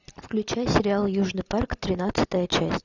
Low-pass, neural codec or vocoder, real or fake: 7.2 kHz; none; real